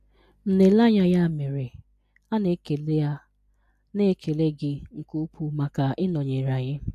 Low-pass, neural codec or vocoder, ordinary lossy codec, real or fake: 14.4 kHz; none; MP3, 64 kbps; real